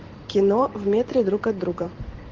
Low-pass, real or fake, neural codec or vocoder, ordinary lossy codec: 7.2 kHz; fake; vocoder, 44.1 kHz, 128 mel bands every 512 samples, BigVGAN v2; Opus, 16 kbps